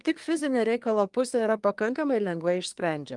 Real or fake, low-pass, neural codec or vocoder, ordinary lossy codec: fake; 10.8 kHz; codec, 24 kHz, 1 kbps, SNAC; Opus, 32 kbps